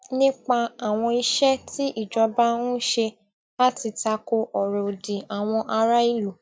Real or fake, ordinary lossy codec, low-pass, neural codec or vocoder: real; none; none; none